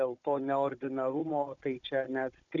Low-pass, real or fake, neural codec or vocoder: 7.2 kHz; fake; codec, 16 kHz, 16 kbps, FunCodec, trained on Chinese and English, 50 frames a second